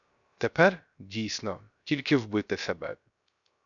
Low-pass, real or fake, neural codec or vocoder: 7.2 kHz; fake; codec, 16 kHz, 0.3 kbps, FocalCodec